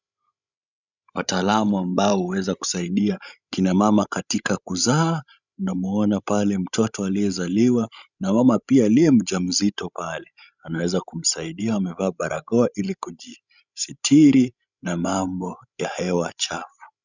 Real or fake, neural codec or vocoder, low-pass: fake; codec, 16 kHz, 16 kbps, FreqCodec, larger model; 7.2 kHz